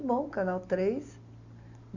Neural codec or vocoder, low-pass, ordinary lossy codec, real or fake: none; 7.2 kHz; Opus, 64 kbps; real